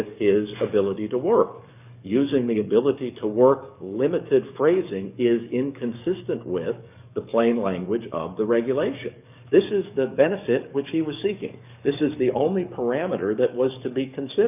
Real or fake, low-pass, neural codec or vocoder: fake; 3.6 kHz; codec, 44.1 kHz, 7.8 kbps, DAC